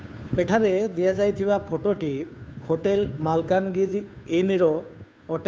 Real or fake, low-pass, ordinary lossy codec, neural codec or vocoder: fake; none; none; codec, 16 kHz, 2 kbps, FunCodec, trained on Chinese and English, 25 frames a second